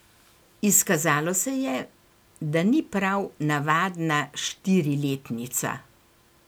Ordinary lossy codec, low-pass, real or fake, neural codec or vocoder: none; none; real; none